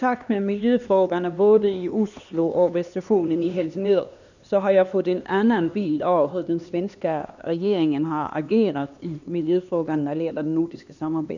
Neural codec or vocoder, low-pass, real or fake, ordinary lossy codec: codec, 16 kHz, 2 kbps, X-Codec, HuBERT features, trained on LibriSpeech; 7.2 kHz; fake; none